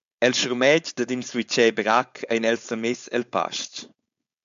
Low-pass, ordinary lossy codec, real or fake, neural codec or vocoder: 7.2 kHz; MP3, 64 kbps; fake; codec, 16 kHz, 4.8 kbps, FACodec